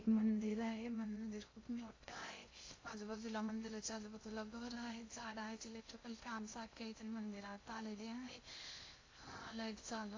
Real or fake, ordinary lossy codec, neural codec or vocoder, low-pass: fake; AAC, 32 kbps; codec, 16 kHz in and 24 kHz out, 0.6 kbps, FocalCodec, streaming, 2048 codes; 7.2 kHz